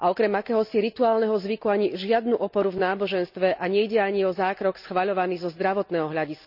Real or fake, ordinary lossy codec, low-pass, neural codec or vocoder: real; none; 5.4 kHz; none